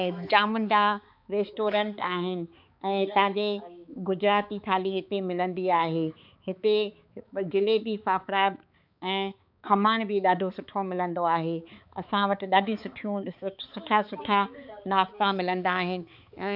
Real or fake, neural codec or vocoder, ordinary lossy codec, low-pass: fake; codec, 16 kHz, 4 kbps, X-Codec, HuBERT features, trained on balanced general audio; none; 5.4 kHz